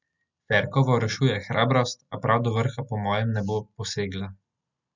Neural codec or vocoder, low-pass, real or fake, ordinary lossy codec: none; 7.2 kHz; real; none